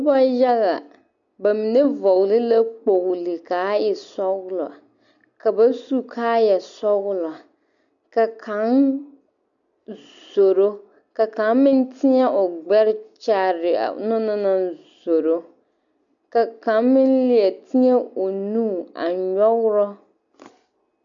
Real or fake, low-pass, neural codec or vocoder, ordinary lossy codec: real; 7.2 kHz; none; MP3, 96 kbps